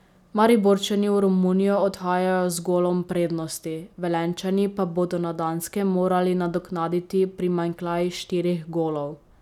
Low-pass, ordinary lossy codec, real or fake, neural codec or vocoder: 19.8 kHz; none; real; none